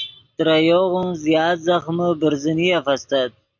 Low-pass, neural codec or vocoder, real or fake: 7.2 kHz; none; real